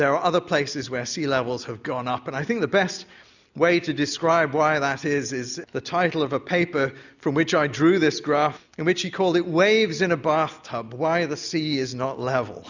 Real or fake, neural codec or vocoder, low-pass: real; none; 7.2 kHz